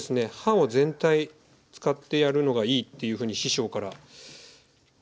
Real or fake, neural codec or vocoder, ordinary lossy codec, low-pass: real; none; none; none